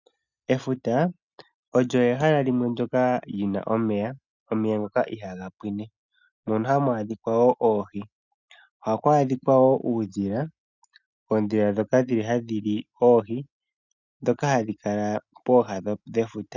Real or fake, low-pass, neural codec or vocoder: real; 7.2 kHz; none